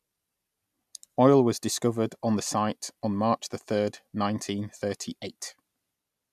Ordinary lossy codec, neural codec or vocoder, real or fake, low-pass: none; none; real; 14.4 kHz